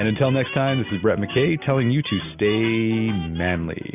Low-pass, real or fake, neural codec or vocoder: 3.6 kHz; real; none